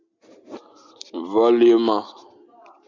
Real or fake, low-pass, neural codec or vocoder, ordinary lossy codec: real; 7.2 kHz; none; MP3, 64 kbps